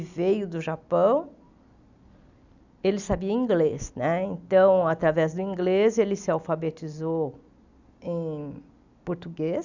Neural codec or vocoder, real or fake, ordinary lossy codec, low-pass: none; real; none; 7.2 kHz